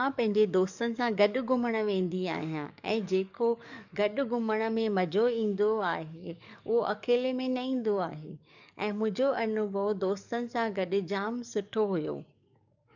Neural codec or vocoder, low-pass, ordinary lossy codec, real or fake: codec, 44.1 kHz, 7.8 kbps, DAC; 7.2 kHz; none; fake